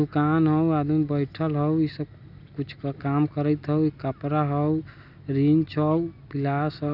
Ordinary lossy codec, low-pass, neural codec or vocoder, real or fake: none; 5.4 kHz; none; real